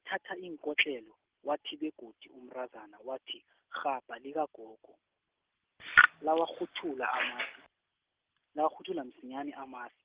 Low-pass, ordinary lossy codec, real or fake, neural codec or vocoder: 3.6 kHz; Opus, 16 kbps; real; none